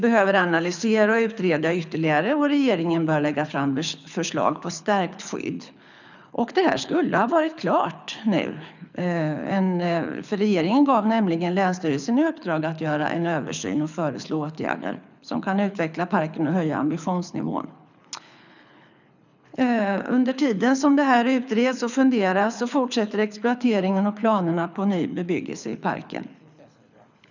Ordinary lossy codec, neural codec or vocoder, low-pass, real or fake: none; codec, 24 kHz, 6 kbps, HILCodec; 7.2 kHz; fake